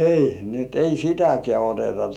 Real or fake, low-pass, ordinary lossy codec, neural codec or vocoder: fake; 19.8 kHz; none; autoencoder, 48 kHz, 128 numbers a frame, DAC-VAE, trained on Japanese speech